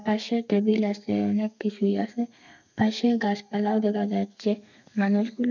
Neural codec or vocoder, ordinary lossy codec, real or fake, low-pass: codec, 32 kHz, 1.9 kbps, SNAC; none; fake; 7.2 kHz